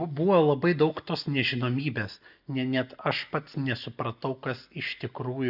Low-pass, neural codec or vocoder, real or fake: 5.4 kHz; none; real